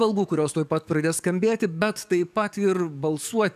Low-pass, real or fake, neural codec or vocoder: 14.4 kHz; fake; codec, 44.1 kHz, 7.8 kbps, DAC